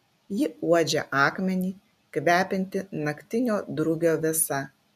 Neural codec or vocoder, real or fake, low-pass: none; real; 14.4 kHz